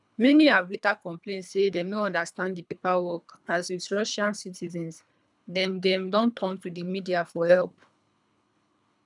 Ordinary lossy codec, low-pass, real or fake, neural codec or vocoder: none; 10.8 kHz; fake; codec, 24 kHz, 3 kbps, HILCodec